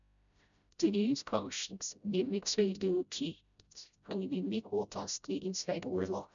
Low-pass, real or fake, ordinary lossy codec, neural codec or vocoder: 7.2 kHz; fake; none; codec, 16 kHz, 0.5 kbps, FreqCodec, smaller model